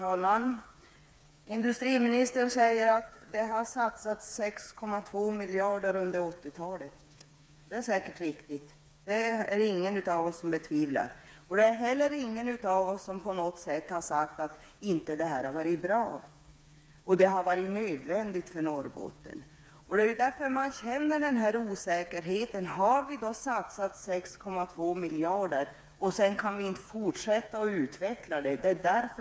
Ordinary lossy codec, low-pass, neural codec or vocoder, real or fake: none; none; codec, 16 kHz, 4 kbps, FreqCodec, smaller model; fake